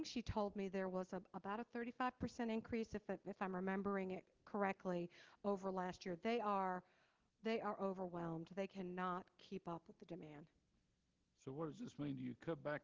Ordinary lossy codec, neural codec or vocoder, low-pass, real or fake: Opus, 16 kbps; none; 7.2 kHz; real